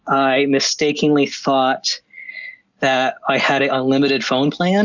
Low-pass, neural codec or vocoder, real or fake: 7.2 kHz; none; real